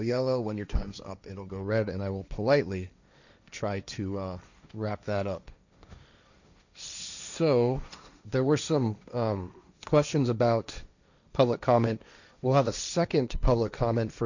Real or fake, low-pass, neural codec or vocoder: fake; 7.2 kHz; codec, 16 kHz, 1.1 kbps, Voila-Tokenizer